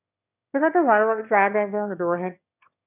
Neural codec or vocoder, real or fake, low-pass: autoencoder, 22.05 kHz, a latent of 192 numbers a frame, VITS, trained on one speaker; fake; 3.6 kHz